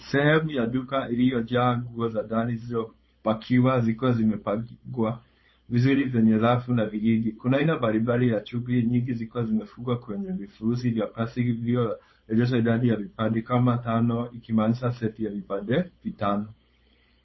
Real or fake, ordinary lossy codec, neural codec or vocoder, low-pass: fake; MP3, 24 kbps; codec, 16 kHz, 4.8 kbps, FACodec; 7.2 kHz